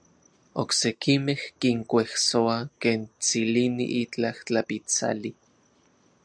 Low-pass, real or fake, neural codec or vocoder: 9.9 kHz; real; none